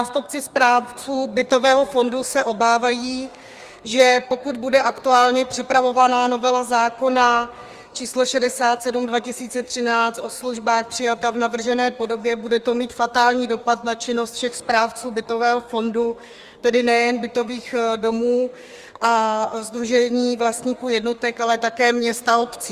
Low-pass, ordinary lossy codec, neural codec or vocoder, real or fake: 14.4 kHz; Opus, 32 kbps; codec, 32 kHz, 1.9 kbps, SNAC; fake